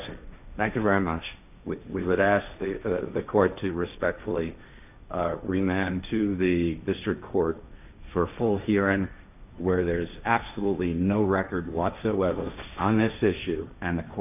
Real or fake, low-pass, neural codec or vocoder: fake; 3.6 kHz; codec, 16 kHz, 1.1 kbps, Voila-Tokenizer